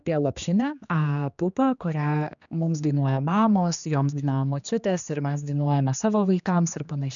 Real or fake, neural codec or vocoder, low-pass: fake; codec, 16 kHz, 2 kbps, X-Codec, HuBERT features, trained on general audio; 7.2 kHz